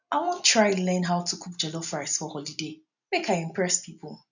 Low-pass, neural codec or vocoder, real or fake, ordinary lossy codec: 7.2 kHz; none; real; none